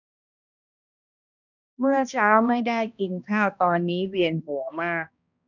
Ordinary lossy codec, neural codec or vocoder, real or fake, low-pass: none; codec, 16 kHz, 1 kbps, X-Codec, HuBERT features, trained on balanced general audio; fake; 7.2 kHz